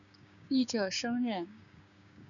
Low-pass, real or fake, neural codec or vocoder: 7.2 kHz; fake; codec, 16 kHz, 6 kbps, DAC